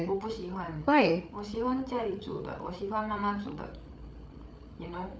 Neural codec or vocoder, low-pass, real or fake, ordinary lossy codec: codec, 16 kHz, 8 kbps, FreqCodec, larger model; none; fake; none